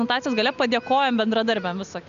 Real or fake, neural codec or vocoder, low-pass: real; none; 7.2 kHz